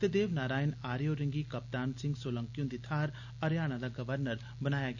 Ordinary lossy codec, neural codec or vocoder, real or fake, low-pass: none; none; real; 7.2 kHz